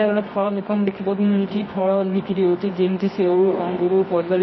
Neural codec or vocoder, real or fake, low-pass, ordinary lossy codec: codec, 24 kHz, 0.9 kbps, WavTokenizer, medium music audio release; fake; 7.2 kHz; MP3, 24 kbps